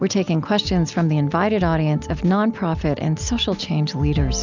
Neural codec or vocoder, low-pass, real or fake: none; 7.2 kHz; real